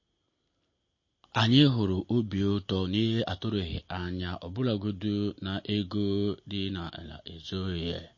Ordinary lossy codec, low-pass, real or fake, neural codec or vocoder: MP3, 32 kbps; 7.2 kHz; real; none